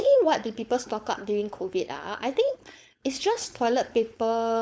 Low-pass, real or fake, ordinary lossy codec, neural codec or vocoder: none; fake; none; codec, 16 kHz, 4.8 kbps, FACodec